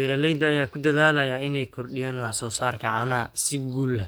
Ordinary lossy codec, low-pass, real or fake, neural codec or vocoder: none; none; fake; codec, 44.1 kHz, 2.6 kbps, SNAC